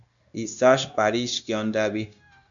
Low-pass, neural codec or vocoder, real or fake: 7.2 kHz; codec, 16 kHz, 0.9 kbps, LongCat-Audio-Codec; fake